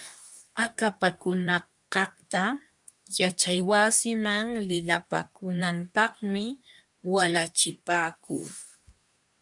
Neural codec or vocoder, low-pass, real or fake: codec, 24 kHz, 1 kbps, SNAC; 10.8 kHz; fake